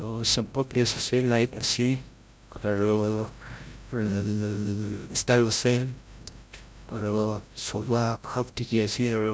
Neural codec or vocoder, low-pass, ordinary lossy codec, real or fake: codec, 16 kHz, 0.5 kbps, FreqCodec, larger model; none; none; fake